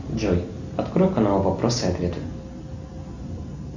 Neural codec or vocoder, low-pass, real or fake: none; 7.2 kHz; real